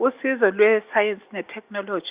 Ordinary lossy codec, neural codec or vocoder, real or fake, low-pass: Opus, 64 kbps; none; real; 3.6 kHz